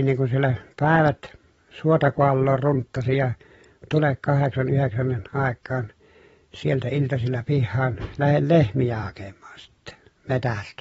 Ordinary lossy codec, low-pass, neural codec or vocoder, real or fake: AAC, 24 kbps; 19.8 kHz; vocoder, 44.1 kHz, 128 mel bands every 256 samples, BigVGAN v2; fake